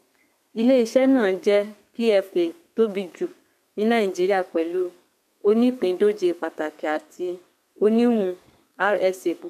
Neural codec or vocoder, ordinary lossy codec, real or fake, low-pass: codec, 32 kHz, 1.9 kbps, SNAC; MP3, 96 kbps; fake; 14.4 kHz